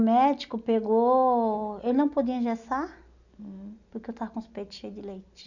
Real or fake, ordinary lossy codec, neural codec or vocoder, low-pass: real; none; none; 7.2 kHz